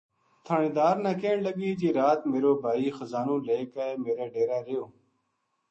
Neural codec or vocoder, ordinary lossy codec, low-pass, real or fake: autoencoder, 48 kHz, 128 numbers a frame, DAC-VAE, trained on Japanese speech; MP3, 32 kbps; 10.8 kHz; fake